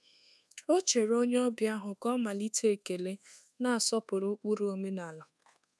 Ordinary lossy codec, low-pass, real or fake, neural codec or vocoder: none; none; fake; codec, 24 kHz, 1.2 kbps, DualCodec